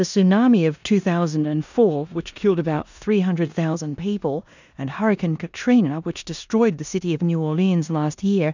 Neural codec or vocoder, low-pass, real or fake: codec, 16 kHz in and 24 kHz out, 0.9 kbps, LongCat-Audio-Codec, four codebook decoder; 7.2 kHz; fake